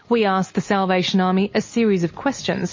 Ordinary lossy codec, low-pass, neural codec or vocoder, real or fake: MP3, 32 kbps; 7.2 kHz; none; real